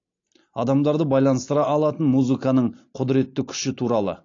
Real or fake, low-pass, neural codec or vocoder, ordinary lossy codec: real; 7.2 kHz; none; AAC, 48 kbps